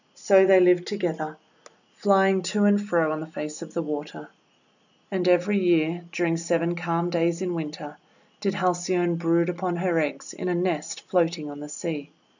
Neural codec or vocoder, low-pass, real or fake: none; 7.2 kHz; real